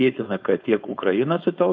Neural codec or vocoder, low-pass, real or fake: codec, 16 kHz, 4.8 kbps, FACodec; 7.2 kHz; fake